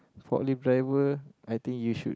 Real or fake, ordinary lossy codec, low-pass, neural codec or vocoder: real; none; none; none